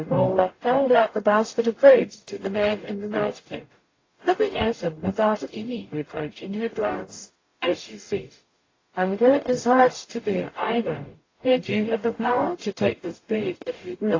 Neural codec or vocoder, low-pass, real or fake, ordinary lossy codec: codec, 44.1 kHz, 0.9 kbps, DAC; 7.2 kHz; fake; AAC, 32 kbps